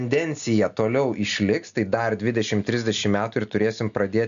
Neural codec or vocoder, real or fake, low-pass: none; real; 7.2 kHz